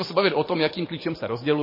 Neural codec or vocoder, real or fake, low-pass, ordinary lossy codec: vocoder, 44.1 kHz, 128 mel bands every 512 samples, BigVGAN v2; fake; 5.4 kHz; MP3, 24 kbps